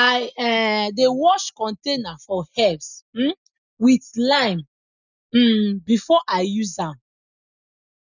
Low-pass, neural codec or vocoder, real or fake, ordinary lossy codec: 7.2 kHz; none; real; none